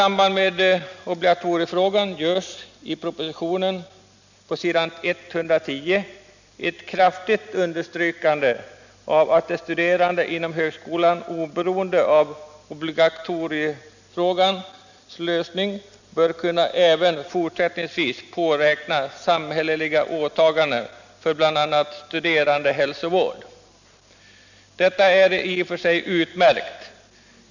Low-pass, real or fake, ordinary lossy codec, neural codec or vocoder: 7.2 kHz; real; none; none